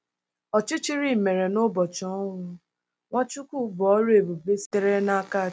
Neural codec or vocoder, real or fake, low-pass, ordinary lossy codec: none; real; none; none